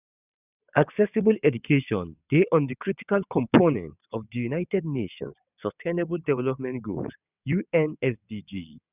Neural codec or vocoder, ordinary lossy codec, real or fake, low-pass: vocoder, 22.05 kHz, 80 mel bands, WaveNeXt; none; fake; 3.6 kHz